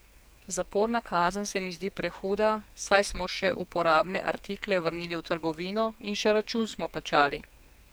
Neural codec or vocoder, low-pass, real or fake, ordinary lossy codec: codec, 44.1 kHz, 2.6 kbps, SNAC; none; fake; none